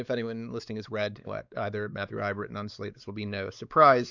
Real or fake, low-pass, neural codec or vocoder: fake; 7.2 kHz; codec, 16 kHz, 4 kbps, X-Codec, WavLM features, trained on Multilingual LibriSpeech